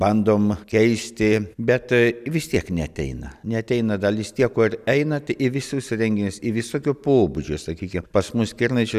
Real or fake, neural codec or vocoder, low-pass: real; none; 14.4 kHz